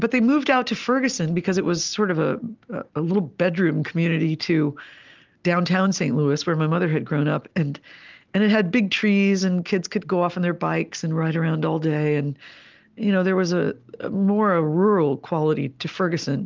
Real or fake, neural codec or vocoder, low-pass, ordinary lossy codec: real; none; 7.2 kHz; Opus, 32 kbps